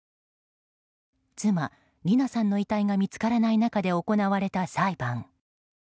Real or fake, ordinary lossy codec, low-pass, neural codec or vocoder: real; none; none; none